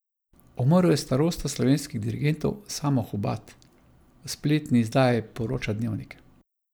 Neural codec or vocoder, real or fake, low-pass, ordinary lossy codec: none; real; none; none